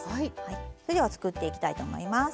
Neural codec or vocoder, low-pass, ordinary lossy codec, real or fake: none; none; none; real